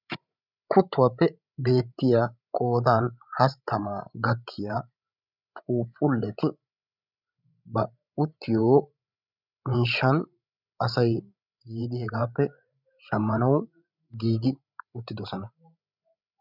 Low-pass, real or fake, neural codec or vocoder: 5.4 kHz; fake; codec, 16 kHz, 16 kbps, FreqCodec, larger model